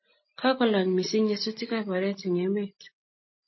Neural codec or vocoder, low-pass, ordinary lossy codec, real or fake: none; 7.2 kHz; MP3, 24 kbps; real